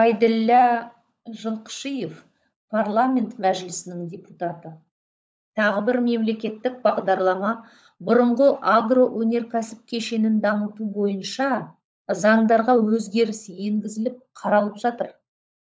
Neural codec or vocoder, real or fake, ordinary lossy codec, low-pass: codec, 16 kHz, 16 kbps, FunCodec, trained on LibriTTS, 50 frames a second; fake; none; none